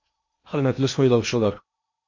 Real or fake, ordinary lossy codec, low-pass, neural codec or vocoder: fake; MP3, 48 kbps; 7.2 kHz; codec, 16 kHz in and 24 kHz out, 0.8 kbps, FocalCodec, streaming, 65536 codes